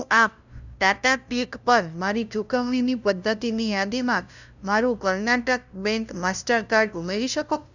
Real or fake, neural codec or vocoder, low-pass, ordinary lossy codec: fake; codec, 16 kHz, 0.5 kbps, FunCodec, trained on LibriTTS, 25 frames a second; 7.2 kHz; none